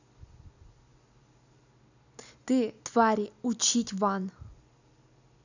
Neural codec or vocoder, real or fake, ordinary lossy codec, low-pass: none; real; none; 7.2 kHz